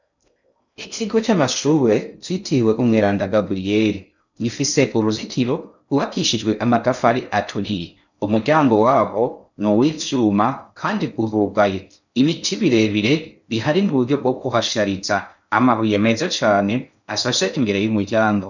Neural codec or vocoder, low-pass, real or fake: codec, 16 kHz in and 24 kHz out, 0.6 kbps, FocalCodec, streaming, 2048 codes; 7.2 kHz; fake